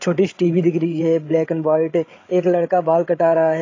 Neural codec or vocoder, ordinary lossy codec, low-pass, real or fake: codec, 16 kHz, 8 kbps, FreqCodec, larger model; AAC, 32 kbps; 7.2 kHz; fake